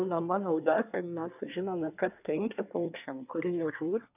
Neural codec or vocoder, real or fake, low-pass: codec, 24 kHz, 1 kbps, SNAC; fake; 3.6 kHz